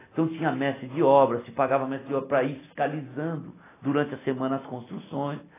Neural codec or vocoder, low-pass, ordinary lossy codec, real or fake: none; 3.6 kHz; AAC, 16 kbps; real